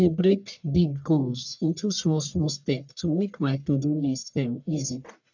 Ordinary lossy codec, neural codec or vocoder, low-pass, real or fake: none; codec, 44.1 kHz, 1.7 kbps, Pupu-Codec; 7.2 kHz; fake